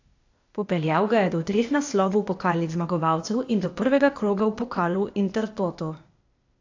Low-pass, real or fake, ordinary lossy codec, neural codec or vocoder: 7.2 kHz; fake; AAC, 48 kbps; codec, 16 kHz, 0.8 kbps, ZipCodec